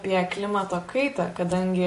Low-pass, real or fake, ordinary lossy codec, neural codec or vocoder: 14.4 kHz; real; MP3, 48 kbps; none